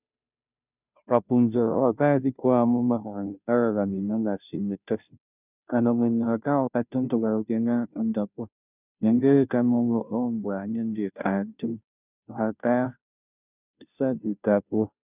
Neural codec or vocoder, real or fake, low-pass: codec, 16 kHz, 0.5 kbps, FunCodec, trained on Chinese and English, 25 frames a second; fake; 3.6 kHz